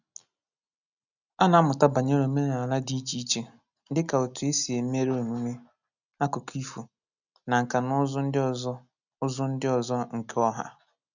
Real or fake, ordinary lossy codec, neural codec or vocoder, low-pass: real; none; none; 7.2 kHz